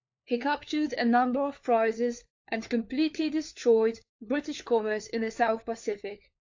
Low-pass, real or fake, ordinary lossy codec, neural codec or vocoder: 7.2 kHz; fake; AAC, 48 kbps; codec, 16 kHz, 4 kbps, FunCodec, trained on LibriTTS, 50 frames a second